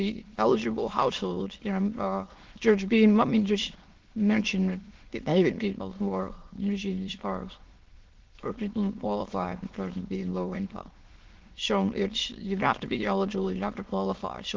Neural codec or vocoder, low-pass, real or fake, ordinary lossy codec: autoencoder, 22.05 kHz, a latent of 192 numbers a frame, VITS, trained on many speakers; 7.2 kHz; fake; Opus, 16 kbps